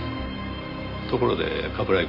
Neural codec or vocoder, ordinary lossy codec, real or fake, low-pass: none; AAC, 32 kbps; real; 5.4 kHz